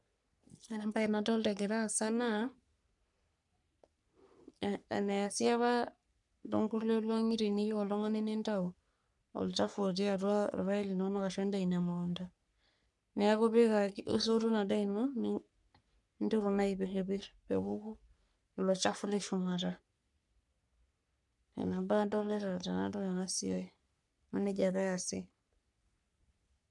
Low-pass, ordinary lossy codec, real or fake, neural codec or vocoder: 10.8 kHz; none; fake; codec, 44.1 kHz, 3.4 kbps, Pupu-Codec